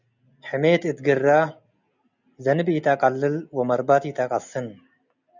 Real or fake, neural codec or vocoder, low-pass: real; none; 7.2 kHz